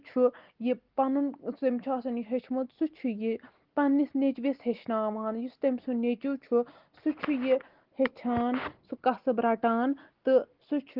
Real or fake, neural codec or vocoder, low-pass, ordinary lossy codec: real; none; 5.4 kHz; Opus, 16 kbps